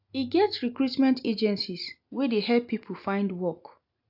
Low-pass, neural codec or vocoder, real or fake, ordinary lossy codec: 5.4 kHz; none; real; AAC, 48 kbps